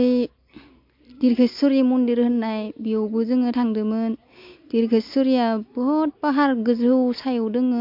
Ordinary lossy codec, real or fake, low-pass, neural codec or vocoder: MP3, 48 kbps; real; 5.4 kHz; none